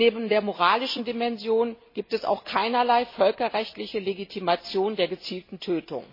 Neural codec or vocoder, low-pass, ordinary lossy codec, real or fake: none; 5.4 kHz; MP3, 24 kbps; real